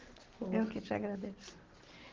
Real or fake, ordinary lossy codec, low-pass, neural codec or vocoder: real; Opus, 16 kbps; 7.2 kHz; none